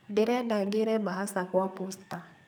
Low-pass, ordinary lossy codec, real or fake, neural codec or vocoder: none; none; fake; codec, 44.1 kHz, 2.6 kbps, SNAC